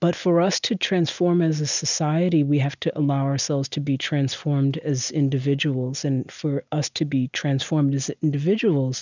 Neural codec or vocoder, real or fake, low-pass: none; real; 7.2 kHz